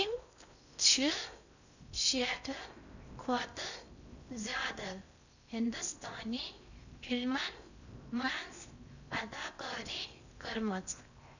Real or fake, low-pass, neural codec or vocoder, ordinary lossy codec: fake; 7.2 kHz; codec, 16 kHz in and 24 kHz out, 0.6 kbps, FocalCodec, streaming, 4096 codes; none